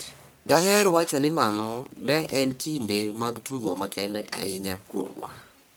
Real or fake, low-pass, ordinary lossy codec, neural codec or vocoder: fake; none; none; codec, 44.1 kHz, 1.7 kbps, Pupu-Codec